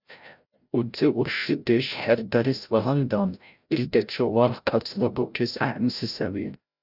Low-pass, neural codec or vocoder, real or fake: 5.4 kHz; codec, 16 kHz, 0.5 kbps, FreqCodec, larger model; fake